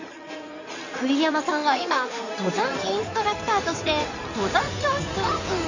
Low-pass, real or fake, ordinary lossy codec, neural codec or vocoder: 7.2 kHz; fake; none; codec, 16 kHz in and 24 kHz out, 2.2 kbps, FireRedTTS-2 codec